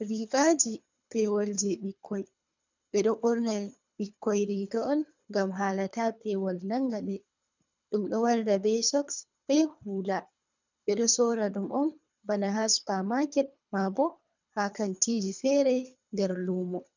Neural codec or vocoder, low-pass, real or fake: codec, 24 kHz, 3 kbps, HILCodec; 7.2 kHz; fake